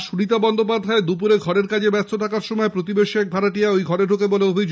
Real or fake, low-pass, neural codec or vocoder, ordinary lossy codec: real; none; none; none